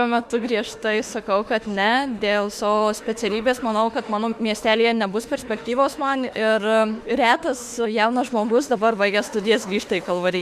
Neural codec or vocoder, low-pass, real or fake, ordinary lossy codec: autoencoder, 48 kHz, 32 numbers a frame, DAC-VAE, trained on Japanese speech; 14.4 kHz; fake; Opus, 64 kbps